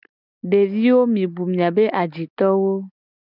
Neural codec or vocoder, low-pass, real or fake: none; 5.4 kHz; real